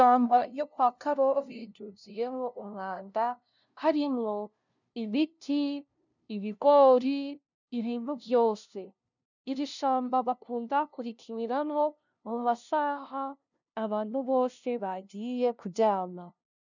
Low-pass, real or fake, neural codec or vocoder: 7.2 kHz; fake; codec, 16 kHz, 0.5 kbps, FunCodec, trained on LibriTTS, 25 frames a second